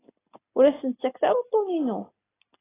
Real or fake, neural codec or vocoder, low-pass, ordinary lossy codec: real; none; 3.6 kHz; AAC, 16 kbps